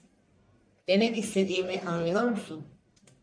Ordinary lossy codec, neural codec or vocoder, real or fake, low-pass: MP3, 48 kbps; codec, 44.1 kHz, 1.7 kbps, Pupu-Codec; fake; 9.9 kHz